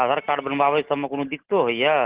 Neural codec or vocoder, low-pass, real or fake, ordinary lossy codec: none; 3.6 kHz; real; Opus, 16 kbps